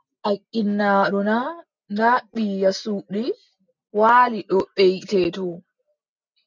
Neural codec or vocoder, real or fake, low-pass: none; real; 7.2 kHz